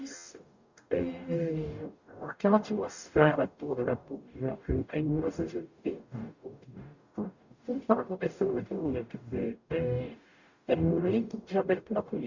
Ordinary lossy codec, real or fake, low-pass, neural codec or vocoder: Opus, 64 kbps; fake; 7.2 kHz; codec, 44.1 kHz, 0.9 kbps, DAC